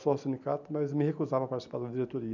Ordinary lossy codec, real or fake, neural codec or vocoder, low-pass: none; real; none; 7.2 kHz